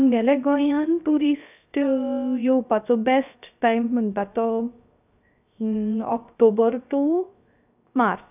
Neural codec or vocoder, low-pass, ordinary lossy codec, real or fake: codec, 16 kHz, 0.3 kbps, FocalCodec; 3.6 kHz; none; fake